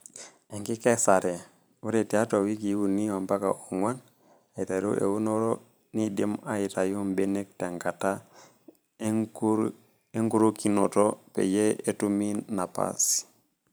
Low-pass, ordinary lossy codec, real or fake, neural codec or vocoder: none; none; fake; vocoder, 44.1 kHz, 128 mel bands every 512 samples, BigVGAN v2